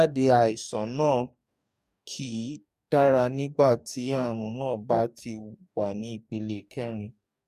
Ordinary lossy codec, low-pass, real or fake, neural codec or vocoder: MP3, 96 kbps; 14.4 kHz; fake; codec, 44.1 kHz, 2.6 kbps, DAC